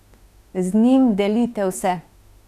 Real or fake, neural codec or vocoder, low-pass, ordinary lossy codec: fake; autoencoder, 48 kHz, 32 numbers a frame, DAC-VAE, trained on Japanese speech; 14.4 kHz; none